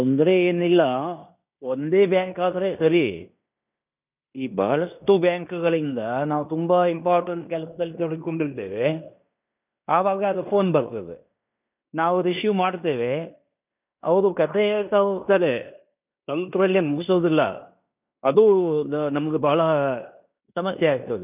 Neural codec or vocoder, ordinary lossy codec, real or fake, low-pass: codec, 16 kHz in and 24 kHz out, 0.9 kbps, LongCat-Audio-Codec, fine tuned four codebook decoder; none; fake; 3.6 kHz